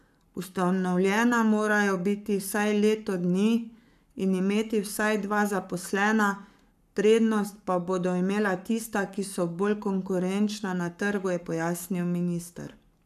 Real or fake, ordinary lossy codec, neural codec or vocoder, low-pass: fake; none; codec, 44.1 kHz, 7.8 kbps, Pupu-Codec; 14.4 kHz